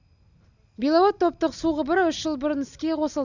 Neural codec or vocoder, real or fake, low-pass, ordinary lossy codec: none; real; 7.2 kHz; none